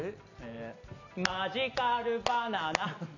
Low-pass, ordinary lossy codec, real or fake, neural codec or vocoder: 7.2 kHz; none; real; none